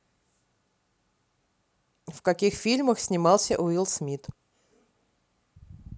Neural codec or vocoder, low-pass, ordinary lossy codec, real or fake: none; none; none; real